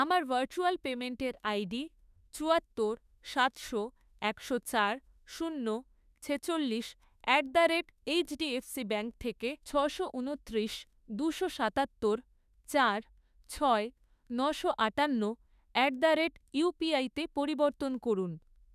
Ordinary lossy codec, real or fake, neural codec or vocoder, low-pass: none; fake; autoencoder, 48 kHz, 32 numbers a frame, DAC-VAE, trained on Japanese speech; 14.4 kHz